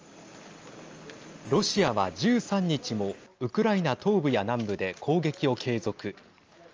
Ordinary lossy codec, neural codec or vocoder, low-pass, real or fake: Opus, 24 kbps; none; 7.2 kHz; real